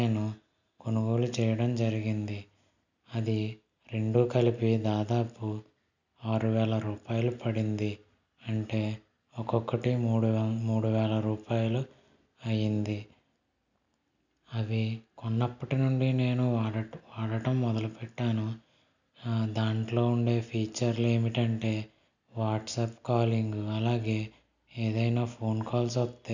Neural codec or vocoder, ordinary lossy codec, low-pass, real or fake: none; none; 7.2 kHz; real